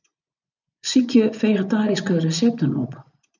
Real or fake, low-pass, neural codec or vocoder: fake; 7.2 kHz; vocoder, 24 kHz, 100 mel bands, Vocos